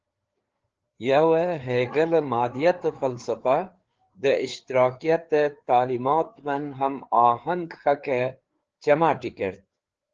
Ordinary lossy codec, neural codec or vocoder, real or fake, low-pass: Opus, 16 kbps; codec, 16 kHz, 4 kbps, FreqCodec, larger model; fake; 7.2 kHz